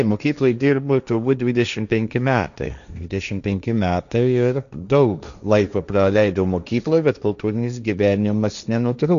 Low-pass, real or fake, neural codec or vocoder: 7.2 kHz; fake; codec, 16 kHz, 1.1 kbps, Voila-Tokenizer